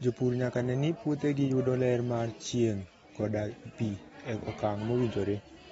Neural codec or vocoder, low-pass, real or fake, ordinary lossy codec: none; 19.8 kHz; real; AAC, 24 kbps